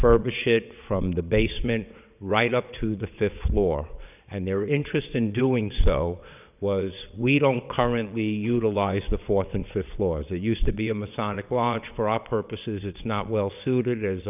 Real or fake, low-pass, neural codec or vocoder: fake; 3.6 kHz; vocoder, 22.05 kHz, 80 mel bands, WaveNeXt